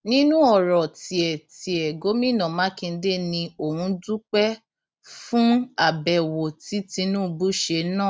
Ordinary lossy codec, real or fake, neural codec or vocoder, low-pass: none; real; none; none